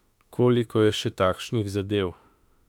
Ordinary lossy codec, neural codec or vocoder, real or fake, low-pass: none; autoencoder, 48 kHz, 32 numbers a frame, DAC-VAE, trained on Japanese speech; fake; 19.8 kHz